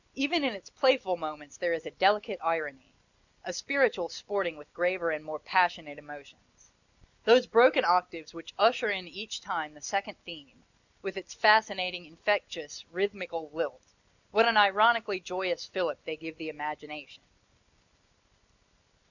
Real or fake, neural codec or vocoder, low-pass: real; none; 7.2 kHz